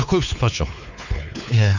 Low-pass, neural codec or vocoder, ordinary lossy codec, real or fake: 7.2 kHz; codec, 16 kHz, 4 kbps, X-Codec, WavLM features, trained on Multilingual LibriSpeech; none; fake